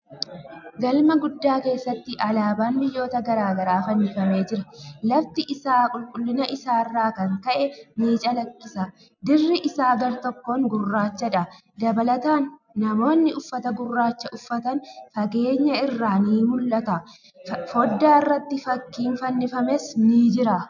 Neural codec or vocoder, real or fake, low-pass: none; real; 7.2 kHz